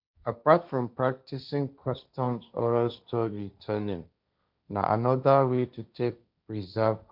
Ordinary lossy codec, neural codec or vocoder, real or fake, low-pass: none; codec, 16 kHz, 1.1 kbps, Voila-Tokenizer; fake; 5.4 kHz